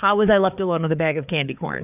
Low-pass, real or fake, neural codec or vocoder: 3.6 kHz; fake; codec, 16 kHz, 4 kbps, FreqCodec, larger model